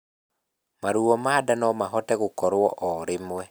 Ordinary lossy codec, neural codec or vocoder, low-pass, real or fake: none; none; none; real